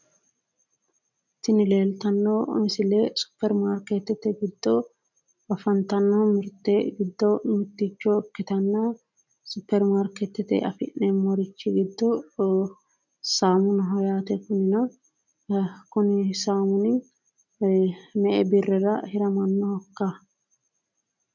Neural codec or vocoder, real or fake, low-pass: none; real; 7.2 kHz